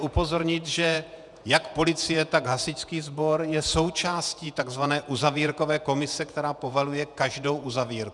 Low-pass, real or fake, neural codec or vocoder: 10.8 kHz; fake; vocoder, 48 kHz, 128 mel bands, Vocos